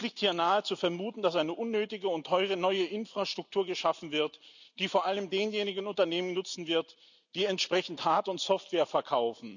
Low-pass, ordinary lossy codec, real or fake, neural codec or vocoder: 7.2 kHz; none; real; none